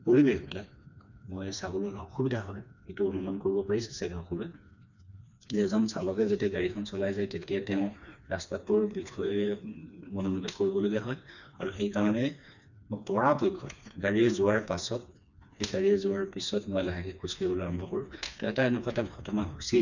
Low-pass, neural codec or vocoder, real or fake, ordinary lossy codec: 7.2 kHz; codec, 16 kHz, 2 kbps, FreqCodec, smaller model; fake; none